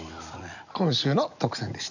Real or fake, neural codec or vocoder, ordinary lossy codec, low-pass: real; none; none; 7.2 kHz